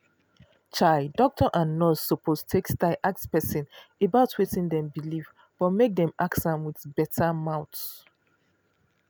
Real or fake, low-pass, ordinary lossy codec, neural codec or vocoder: real; none; none; none